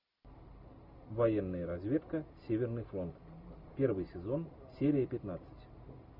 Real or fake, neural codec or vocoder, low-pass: real; none; 5.4 kHz